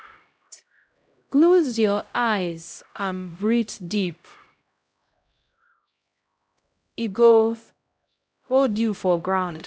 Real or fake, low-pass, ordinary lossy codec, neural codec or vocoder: fake; none; none; codec, 16 kHz, 0.5 kbps, X-Codec, HuBERT features, trained on LibriSpeech